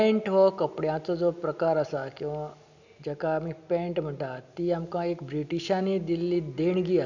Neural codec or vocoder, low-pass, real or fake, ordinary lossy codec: none; 7.2 kHz; real; none